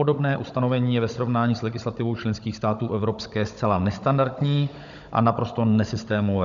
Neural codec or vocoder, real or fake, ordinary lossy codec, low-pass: codec, 16 kHz, 16 kbps, FunCodec, trained on Chinese and English, 50 frames a second; fake; MP3, 96 kbps; 7.2 kHz